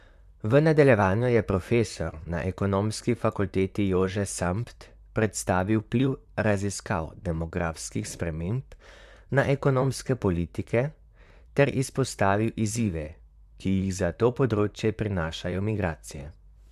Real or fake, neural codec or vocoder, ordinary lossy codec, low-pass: fake; vocoder, 44.1 kHz, 128 mel bands, Pupu-Vocoder; none; 14.4 kHz